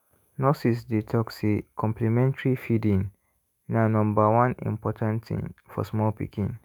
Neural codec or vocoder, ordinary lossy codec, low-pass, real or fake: autoencoder, 48 kHz, 128 numbers a frame, DAC-VAE, trained on Japanese speech; none; none; fake